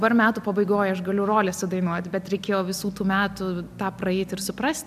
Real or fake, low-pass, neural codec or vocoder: real; 14.4 kHz; none